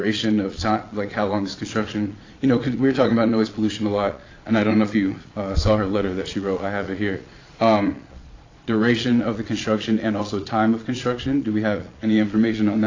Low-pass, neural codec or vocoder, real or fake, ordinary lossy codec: 7.2 kHz; vocoder, 22.05 kHz, 80 mel bands, Vocos; fake; AAC, 32 kbps